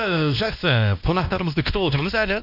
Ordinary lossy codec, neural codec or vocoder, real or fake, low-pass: none; codec, 16 kHz, 1 kbps, X-Codec, WavLM features, trained on Multilingual LibriSpeech; fake; 5.4 kHz